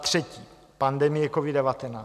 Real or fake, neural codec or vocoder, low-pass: real; none; 14.4 kHz